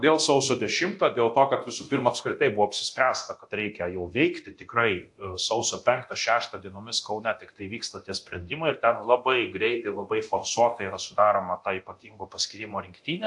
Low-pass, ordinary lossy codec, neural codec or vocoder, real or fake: 10.8 kHz; AAC, 64 kbps; codec, 24 kHz, 0.9 kbps, DualCodec; fake